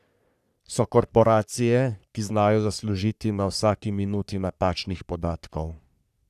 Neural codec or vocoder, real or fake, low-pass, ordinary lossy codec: codec, 44.1 kHz, 3.4 kbps, Pupu-Codec; fake; 14.4 kHz; none